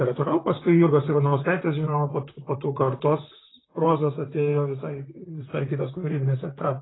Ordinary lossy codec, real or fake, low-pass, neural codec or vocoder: AAC, 16 kbps; fake; 7.2 kHz; vocoder, 44.1 kHz, 128 mel bands, Pupu-Vocoder